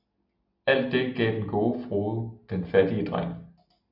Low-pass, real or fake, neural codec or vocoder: 5.4 kHz; real; none